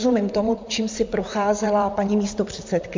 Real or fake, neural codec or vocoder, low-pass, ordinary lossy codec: fake; vocoder, 44.1 kHz, 128 mel bands, Pupu-Vocoder; 7.2 kHz; MP3, 64 kbps